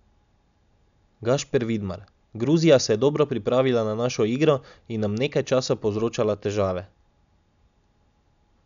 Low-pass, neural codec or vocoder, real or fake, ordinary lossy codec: 7.2 kHz; none; real; none